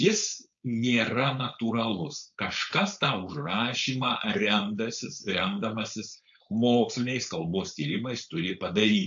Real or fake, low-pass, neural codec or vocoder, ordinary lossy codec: fake; 7.2 kHz; codec, 16 kHz, 4.8 kbps, FACodec; AAC, 64 kbps